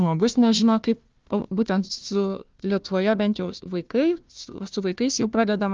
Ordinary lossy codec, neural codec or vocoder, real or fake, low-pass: Opus, 24 kbps; codec, 16 kHz, 1 kbps, FunCodec, trained on Chinese and English, 50 frames a second; fake; 7.2 kHz